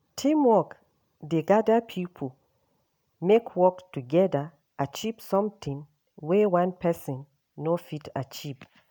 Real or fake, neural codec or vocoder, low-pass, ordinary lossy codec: real; none; none; none